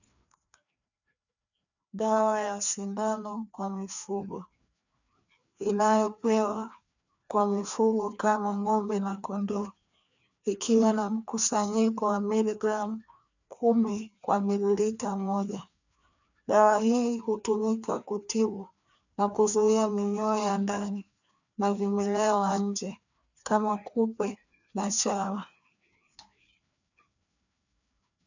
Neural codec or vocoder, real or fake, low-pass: codec, 16 kHz, 2 kbps, FreqCodec, larger model; fake; 7.2 kHz